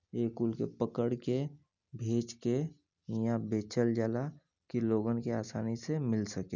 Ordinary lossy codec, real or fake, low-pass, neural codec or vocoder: none; real; 7.2 kHz; none